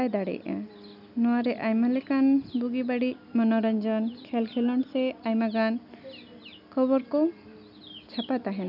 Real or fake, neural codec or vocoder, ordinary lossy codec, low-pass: real; none; none; 5.4 kHz